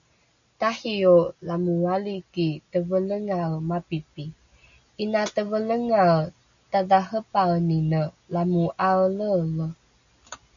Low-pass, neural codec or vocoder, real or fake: 7.2 kHz; none; real